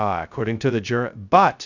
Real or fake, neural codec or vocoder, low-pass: fake; codec, 16 kHz, 0.2 kbps, FocalCodec; 7.2 kHz